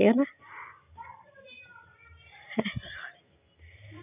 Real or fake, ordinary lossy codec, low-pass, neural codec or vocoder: real; none; 3.6 kHz; none